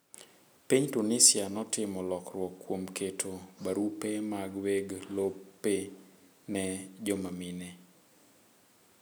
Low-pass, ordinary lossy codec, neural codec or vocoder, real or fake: none; none; none; real